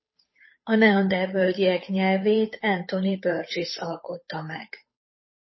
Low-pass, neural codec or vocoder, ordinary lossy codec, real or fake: 7.2 kHz; codec, 16 kHz, 8 kbps, FunCodec, trained on Chinese and English, 25 frames a second; MP3, 24 kbps; fake